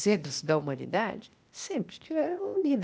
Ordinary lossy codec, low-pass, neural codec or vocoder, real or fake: none; none; codec, 16 kHz, 0.8 kbps, ZipCodec; fake